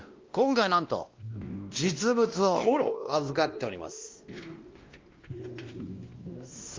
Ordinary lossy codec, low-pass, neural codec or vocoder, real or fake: Opus, 32 kbps; 7.2 kHz; codec, 16 kHz, 1 kbps, X-Codec, WavLM features, trained on Multilingual LibriSpeech; fake